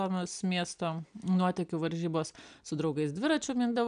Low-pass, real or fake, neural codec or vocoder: 9.9 kHz; real; none